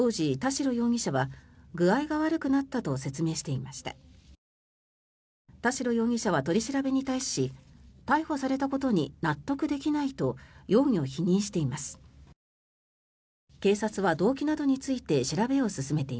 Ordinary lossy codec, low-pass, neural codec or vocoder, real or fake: none; none; none; real